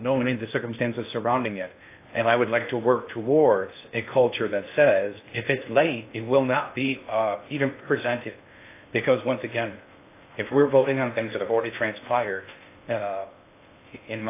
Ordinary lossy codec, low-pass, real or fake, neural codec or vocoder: AAC, 24 kbps; 3.6 kHz; fake; codec, 16 kHz in and 24 kHz out, 0.6 kbps, FocalCodec, streaming, 2048 codes